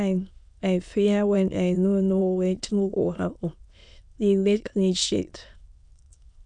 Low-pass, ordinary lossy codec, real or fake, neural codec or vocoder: 9.9 kHz; none; fake; autoencoder, 22.05 kHz, a latent of 192 numbers a frame, VITS, trained on many speakers